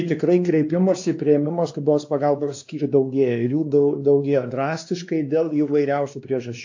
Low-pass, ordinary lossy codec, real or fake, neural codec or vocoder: 7.2 kHz; AAC, 48 kbps; fake; codec, 16 kHz, 2 kbps, X-Codec, WavLM features, trained on Multilingual LibriSpeech